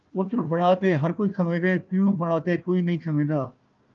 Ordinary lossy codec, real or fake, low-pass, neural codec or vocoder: Opus, 24 kbps; fake; 7.2 kHz; codec, 16 kHz, 1 kbps, FunCodec, trained on Chinese and English, 50 frames a second